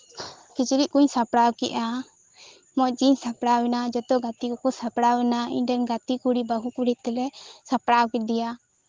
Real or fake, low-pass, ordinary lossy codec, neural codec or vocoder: real; 7.2 kHz; Opus, 16 kbps; none